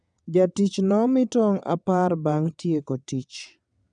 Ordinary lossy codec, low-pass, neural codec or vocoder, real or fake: none; 9.9 kHz; vocoder, 22.05 kHz, 80 mel bands, WaveNeXt; fake